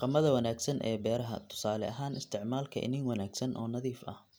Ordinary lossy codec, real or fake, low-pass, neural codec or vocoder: none; real; none; none